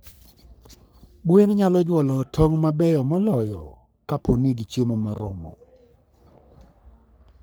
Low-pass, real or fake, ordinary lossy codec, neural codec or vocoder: none; fake; none; codec, 44.1 kHz, 3.4 kbps, Pupu-Codec